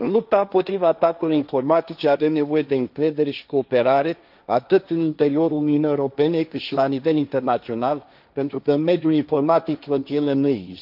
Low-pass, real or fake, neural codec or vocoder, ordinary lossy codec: 5.4 kHz; fake; codec, 16 kHz, 1.1 kbps, Voila-Tokenizer; none